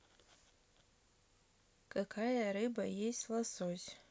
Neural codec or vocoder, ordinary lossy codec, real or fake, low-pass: none; none; real; none